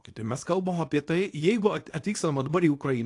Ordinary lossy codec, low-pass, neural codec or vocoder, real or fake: AAC, 48 kbps; 10.8 kHz; codec, 24 kHz, 0.9 kbps, WavTokenizer, small release; fake